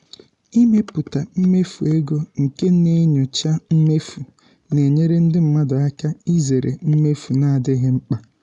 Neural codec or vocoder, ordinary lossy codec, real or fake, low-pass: none; none; real; 10.8 kHz